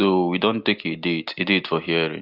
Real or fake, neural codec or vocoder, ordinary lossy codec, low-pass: real; none; Opus, 32 kbps; 5.4 kHz